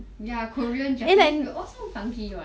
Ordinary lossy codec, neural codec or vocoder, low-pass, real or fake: none; none; none; real